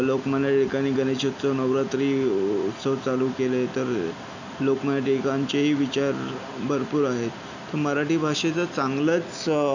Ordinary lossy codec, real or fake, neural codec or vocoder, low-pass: none; real; none; 7.2 kHz